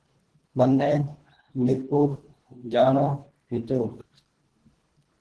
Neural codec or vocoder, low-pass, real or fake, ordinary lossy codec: codec, 24 kHz, 1.5 kbps, HILCodec; 10.8 kHz; fake; Opus, 16 kbps